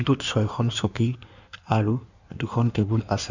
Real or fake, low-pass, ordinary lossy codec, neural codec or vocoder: fake; 7.2 kHz; none; codec, 16 kHz in and 24 kHz out, 1.1 kbps, FireRedTTS-2 codec